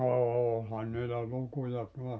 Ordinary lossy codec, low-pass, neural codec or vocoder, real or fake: none; none; none; real